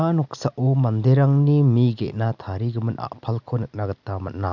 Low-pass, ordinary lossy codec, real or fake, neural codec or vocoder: 7.2 kHz; none; real; none